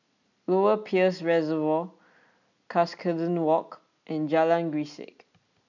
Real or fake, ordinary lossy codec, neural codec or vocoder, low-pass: real; none; none; 7.2 kHz